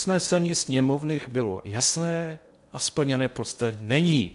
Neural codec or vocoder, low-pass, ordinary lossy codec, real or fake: codec, 16 kHz in and 24 kHz out, 0.8 kbps, FocalCodec, streaming, 65536 codes; 10.8 kHz; MP3, 64 kbps; fake